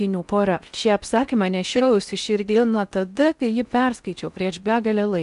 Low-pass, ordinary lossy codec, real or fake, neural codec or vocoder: 10.8 kHz; MP3, 96 kbps; fake; codec, 16 kHz in and 24 kHz out, 0.6 kbps, FocalCodec, streaming, 4096 codes